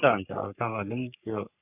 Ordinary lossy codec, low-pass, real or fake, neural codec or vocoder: none; 3.6 kHz; fake; codec, 44.1 kHz, 3.4 kbps, Pupu-Codec